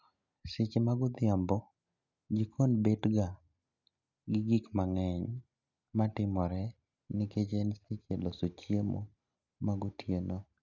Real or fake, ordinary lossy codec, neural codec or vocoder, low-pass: real; none; none; 7.2 kHz